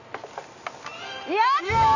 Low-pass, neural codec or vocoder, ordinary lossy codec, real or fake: 7.2 kHz; none; none; real